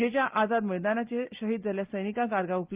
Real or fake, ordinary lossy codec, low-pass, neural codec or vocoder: real; Opus, 32 kbps; 3.6 kHz; none